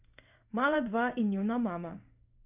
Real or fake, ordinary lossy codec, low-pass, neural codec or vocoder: fake; none; 3.6 kHz; codec, 16 kHz in and 24 kHz out, 1 kbps, XY-Tokenizer